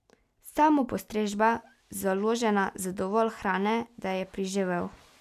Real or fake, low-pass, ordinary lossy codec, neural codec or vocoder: real; 14.4 kHz; none; none